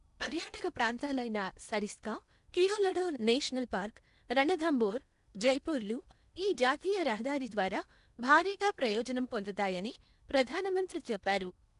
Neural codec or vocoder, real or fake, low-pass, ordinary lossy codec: codec, 16 kHz in and 24 kHz out, 0.8 kbps, FocalCodec, streaming, 65536 codes; fake; 10.8 kHz; none